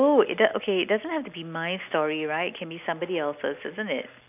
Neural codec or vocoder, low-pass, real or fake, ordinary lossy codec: none; 3.6 kHz; real; none